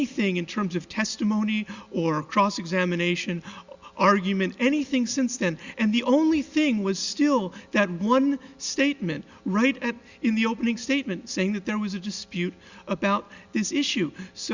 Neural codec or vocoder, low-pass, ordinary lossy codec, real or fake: none; 7.2 kHz; Opus, 64 kbps; real